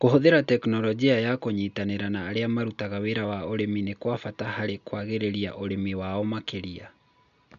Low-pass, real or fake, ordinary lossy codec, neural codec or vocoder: 7.2 kHz; real; none; none